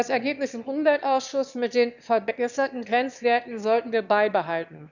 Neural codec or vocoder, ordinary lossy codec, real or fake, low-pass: autoencoder, 22.05 kHz, a latent of 192 numbers a frame, VITS, trained on one speaker; none; fake; 7.2 kHz